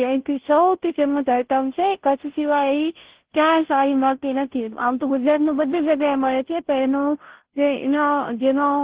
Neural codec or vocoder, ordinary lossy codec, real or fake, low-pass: codec, 16 kHz, 0.5 kbps, FunCodec, trained on Chinese and English, 25 frames a second; Opus, 16 kbps; fake; 3.6 kHz